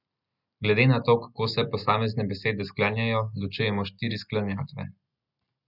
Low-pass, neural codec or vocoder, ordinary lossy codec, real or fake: 5.4 kHz; none; none; real